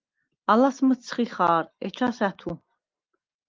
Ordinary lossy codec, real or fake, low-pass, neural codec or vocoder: Opus, 32 kbps; real; 7.2 kHz; none